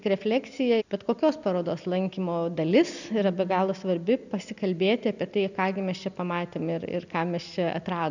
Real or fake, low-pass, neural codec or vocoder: real; 7.2 kHz; none